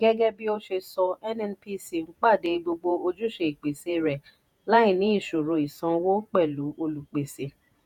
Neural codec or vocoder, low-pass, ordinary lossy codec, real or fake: vocoder, 48 kHz, 128 mel bands, Vocos; 19.8 kHz; none; fake